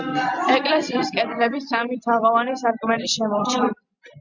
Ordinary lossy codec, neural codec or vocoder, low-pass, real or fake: Opus, 64 kbps; none; 7.2 kHz; real